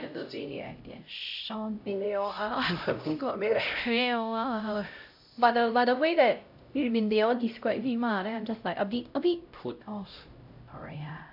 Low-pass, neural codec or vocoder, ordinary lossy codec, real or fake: 5.4 kHz; codec, 16 kHz, 0.5 kbps, X-Codec, HuBERT features, trained on LibriSpeech; AAC, 48 kbps; fake